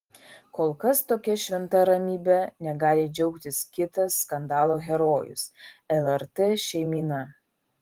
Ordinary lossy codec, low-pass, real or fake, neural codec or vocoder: Opus, 24 kbps; 19.8 kHz; fake; vocoder, 44.1 kHz, 128 mel bands every 512 samples, BigVGAN v2